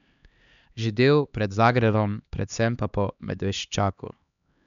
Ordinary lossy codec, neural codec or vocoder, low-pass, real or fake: none; codec, 16 kHz, 2 kbps, X-Codec, HuBERT features, trained on LibriSpeech; 7.2 kHz; fake